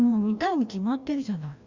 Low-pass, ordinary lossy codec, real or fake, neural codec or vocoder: 7.2 kHz; Opus, 64 kbps; fake; codec, 16 kHz, 1 kbps, FreqCodec, larger model